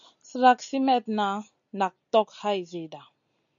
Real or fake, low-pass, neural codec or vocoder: real; 7.2 kHz; none